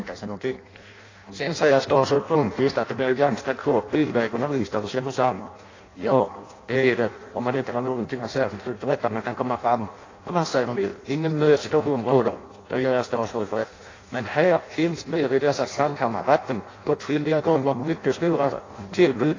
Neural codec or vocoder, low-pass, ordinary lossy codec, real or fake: codec, 16 kHz in and 24 kHz out, 0.6 kbps, FireRedTTS-2 codec; 7.2 kHz; AAC, 32 kbps; fake